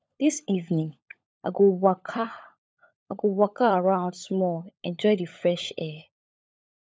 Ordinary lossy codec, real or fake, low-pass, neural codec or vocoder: none; fake; none; codec, 16 kHz, 16 kbps, FunCodec, trained on LibriTTS, 50 frames a second